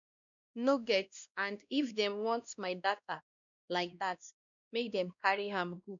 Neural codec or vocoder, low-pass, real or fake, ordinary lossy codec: codec, 16 kHz, 1 kbps, X-Codec, WavLM features, trained on Multilingual LibriSpeech; 7.2 kHz; fake; none